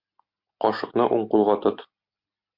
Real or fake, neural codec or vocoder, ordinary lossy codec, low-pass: real; none; MP3, 48 kbps; 5.4 kHz